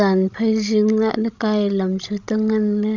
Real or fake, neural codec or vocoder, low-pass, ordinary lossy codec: fake; codec, 16 kHz, 16 kbps, FreqCodec, larger model; 7.2 kHz; none